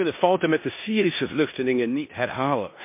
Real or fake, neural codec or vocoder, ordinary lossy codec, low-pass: fake; codec, 16 kHz in and 24 kHz out, 0.9 kbps, LongCat-Audio-Codec, four codebook decoder; MP3, 24 kbps; 3.6 kHz